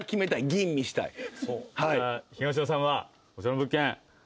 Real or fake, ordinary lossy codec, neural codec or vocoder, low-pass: real; none; none; none